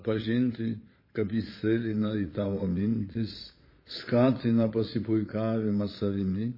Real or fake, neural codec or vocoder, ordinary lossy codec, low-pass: fake; vocoder, 22.05 kHz, 80 mel bands, Vocos; MP3, 24 kbps; 5.4 kHz